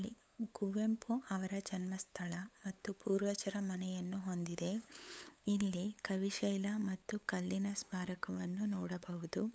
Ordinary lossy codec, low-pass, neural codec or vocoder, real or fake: none; none; codec, 16 kHz, 8 kbps, FunCodec, trained on LibriTTS, 25 frames a second; fake